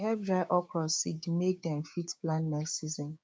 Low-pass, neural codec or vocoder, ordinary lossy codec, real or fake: none; codec, 16 kHz, 6 kbps, DAC; none; fake